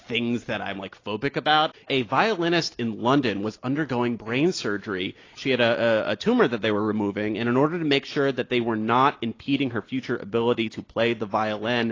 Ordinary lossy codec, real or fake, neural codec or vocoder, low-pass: AAC, 32 kbps; real; none; 7.2 kHz